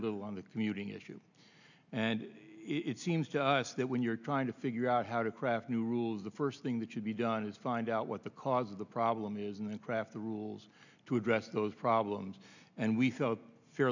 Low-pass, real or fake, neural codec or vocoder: 7.2 kHz; real; none